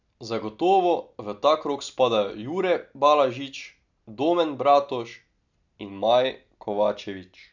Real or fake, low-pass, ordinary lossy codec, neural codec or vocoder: real; 7.2 kHz; none; none